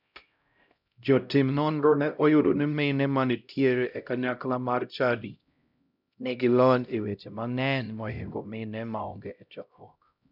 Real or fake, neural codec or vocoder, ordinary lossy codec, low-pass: fake; codec, 16 kHz, 0.5 kbps, X-Codec, HuBERT features, trained on LibriSpeech; none; 5.4 kHz